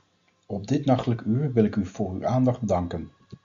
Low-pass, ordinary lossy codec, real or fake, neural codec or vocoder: 7.2 kHz; MP3, 48 kbps; real; none